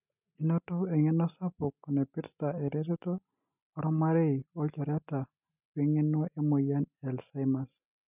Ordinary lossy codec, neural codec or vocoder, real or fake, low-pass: none; none; real; 3.6 kHz